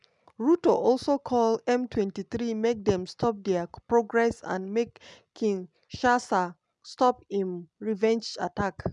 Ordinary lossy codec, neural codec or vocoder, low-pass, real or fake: none; none; 10.8 kHz; real